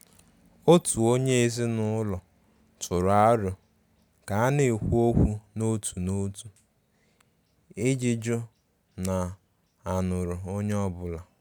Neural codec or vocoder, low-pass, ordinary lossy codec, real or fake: none; none; none; real